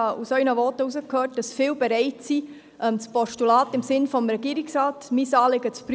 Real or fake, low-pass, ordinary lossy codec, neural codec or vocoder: real; none; none; none